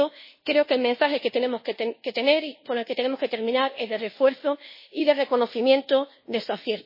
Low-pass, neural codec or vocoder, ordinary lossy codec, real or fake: 5.4 kHz; codec, 24 kHz, 1.2 kbps, DualCodec; MP3, 24 kbps; fake